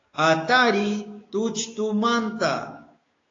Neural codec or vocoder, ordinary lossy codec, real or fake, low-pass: codec, 16 kHz, 6 kbps, DAC; AAC, 32 kbps; fake; 7.2 kHz